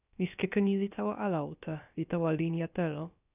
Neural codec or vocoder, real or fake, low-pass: codec, 16 kHz, about 1 kbps, DyCAST, with the encoder's durations; fake; 3.6 kHz